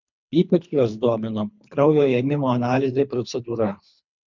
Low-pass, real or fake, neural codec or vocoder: 7.2 kHz; fake; codec, 24 kHz, 3 kbps, HILCodec